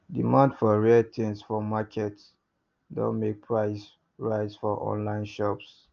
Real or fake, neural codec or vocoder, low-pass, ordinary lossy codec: real; none; 7.2 kHz; Opus, 24 kbps